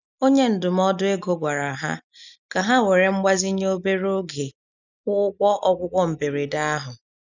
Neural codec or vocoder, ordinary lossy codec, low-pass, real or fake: none; none; 7.2 kHz; real